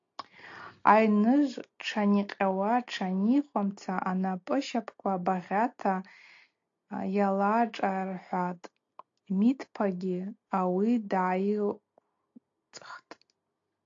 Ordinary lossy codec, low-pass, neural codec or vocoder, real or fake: AAC, 48 kbps; 7.2 kHz; none; real